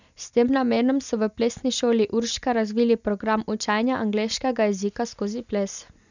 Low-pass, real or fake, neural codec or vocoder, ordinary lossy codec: 7.2 kHz; real; none; none